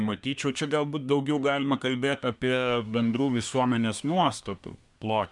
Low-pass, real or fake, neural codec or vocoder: 10.8 kHz; fake; codec, 24 kHz, 1 kbps, SNAC